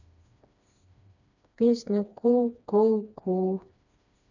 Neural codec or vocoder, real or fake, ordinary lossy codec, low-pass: codec, 16 kHz, 2 kbps, FreqCodec, smaller model; fake; none; 7.2 kHz